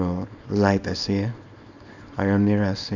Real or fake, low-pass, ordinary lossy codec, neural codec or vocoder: fake; 7.2 kHz; none; codec, 24 kHz, 0.9 kbps, WavTokenizer, small release